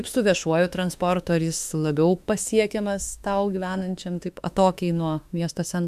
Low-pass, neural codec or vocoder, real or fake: 14.4 kHz; autoencoder, 48 kHz, 32 numbers a frame, DAC-VAE, trained on Japanese speech; fake